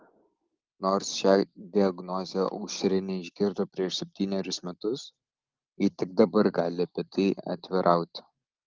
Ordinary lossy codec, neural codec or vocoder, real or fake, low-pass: Opus, 24 kbps; none; real; 7.2 kHz